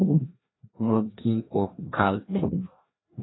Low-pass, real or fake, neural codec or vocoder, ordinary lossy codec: 7.2 kHz; fake; codec, 16 kHz, 1 kbps, FreqCodec, larger model; AAC, 16 kbps